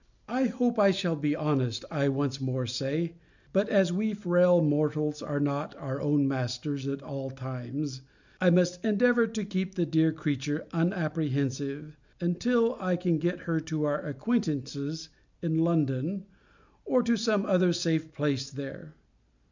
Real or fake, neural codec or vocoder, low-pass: real; none; 7.2 kHz